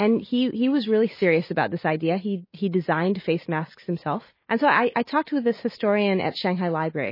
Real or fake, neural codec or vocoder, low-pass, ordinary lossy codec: real; none; 5.4 kHz; MP3, 24 kbps